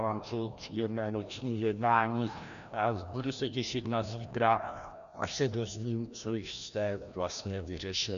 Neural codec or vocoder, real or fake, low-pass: codec, 16 kHz, 1 kbps, FreqCodec, larger model; fake; 7.2 kHz